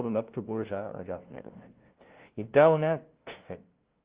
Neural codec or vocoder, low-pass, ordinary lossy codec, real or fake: codec, 16 kHz, 0.5 kbps, FunCodec, trained on LibriTTS, 25 frames a second; 3.6 kHz; Opus, 16 kbps; fake